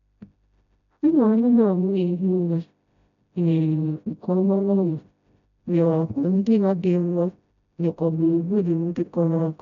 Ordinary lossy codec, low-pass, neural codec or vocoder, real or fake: none; 7.2 kHz; codec, 16 kHz, 0.5 kbps, FreqCodec, smaller model; fake